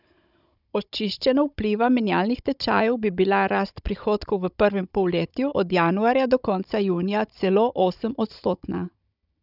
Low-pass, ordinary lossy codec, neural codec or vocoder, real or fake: 5.4 kHz; none; codec, 16 kHz, 16 kbps, FunCodec, trained on Chinese and English, 50 frames a second; fake